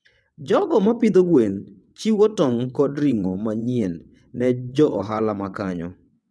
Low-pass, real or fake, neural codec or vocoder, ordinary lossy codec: none; fake; vocoder, 22.05 kHz, 80 mel bands, WaveNeXt; none